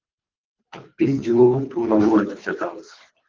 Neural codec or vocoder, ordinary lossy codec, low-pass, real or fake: codec, 24 kHz, 3 kbps, HILCodec; Opus, 32 kbps; 7.2 kHz; fake